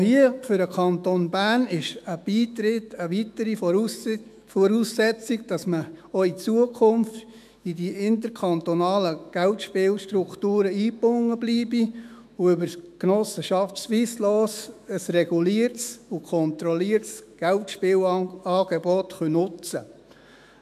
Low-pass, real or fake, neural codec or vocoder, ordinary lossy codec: 14.4 kHz; fake; autoencoder, 48 kHz, 128 numbers a frame, DAC-VAE, trained on Japanese speech; none